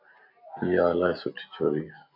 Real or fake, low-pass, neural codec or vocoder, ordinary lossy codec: real; 5.4 kHz; none; MP3, 48 kbps